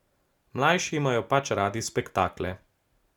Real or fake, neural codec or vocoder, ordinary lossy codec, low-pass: real; none; none; 19.8 kHz